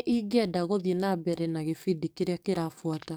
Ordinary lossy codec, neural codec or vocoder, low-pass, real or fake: none; codec, 44.1 kHz, 7.8 kbps, DAC; none; fake